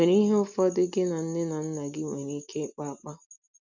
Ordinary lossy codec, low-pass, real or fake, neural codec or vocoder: none; 7.2 kHz; real; none